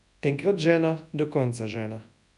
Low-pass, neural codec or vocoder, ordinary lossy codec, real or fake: 10.8 kHz; codec, 24 kHz, 0.9 kbps, WavTokenizer, large speech release; none; fake